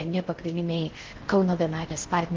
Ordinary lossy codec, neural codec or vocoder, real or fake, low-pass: Opus, 16 kbps; codec, 16 kHz in and 24 kHz out, 0.6 kbps, FocalCodec, streaming, 4096 codes; fake; 7.2 kHz